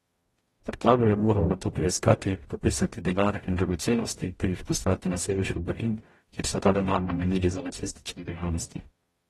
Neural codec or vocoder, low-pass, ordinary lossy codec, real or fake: codec, 44.1 kHz, 0.9 kbps, DAC; 19.8 kHz; AAC, 32 kbps; fake